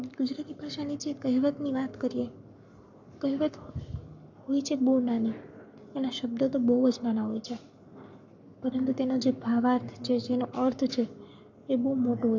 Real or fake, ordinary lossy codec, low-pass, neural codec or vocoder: fake; none; 7.2 kHz; codec, 44.1 kHz, 7.8 kbps, Pupu-Codec